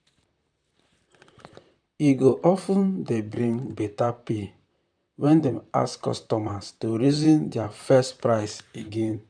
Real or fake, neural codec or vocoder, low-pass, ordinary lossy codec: fake; vocoder, 44.1 kHz, 128 mel bands, Pupu-Vocoder; 9.9 kHz; none